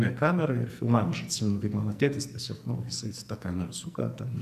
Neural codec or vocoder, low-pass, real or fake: codec, 44.1 kHz, 2.6 kbps, SNAC; 14.4 kHz; fake